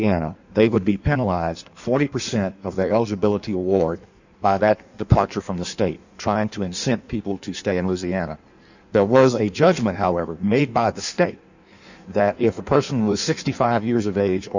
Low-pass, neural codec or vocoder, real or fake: 7.2 kHz; codec, 16 kHz in and 24 kHz out, 1.1 kbps, FireRedTTS-2 codec; fake